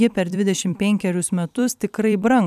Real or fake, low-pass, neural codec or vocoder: fake; 14.4 kHz; vocoder, 44.1 kHz, 128 mel bands every 256 samples, BigVGAN v2